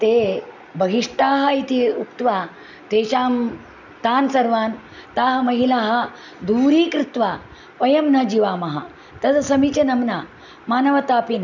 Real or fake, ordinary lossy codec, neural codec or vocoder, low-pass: real; none; none; 7.2 kHz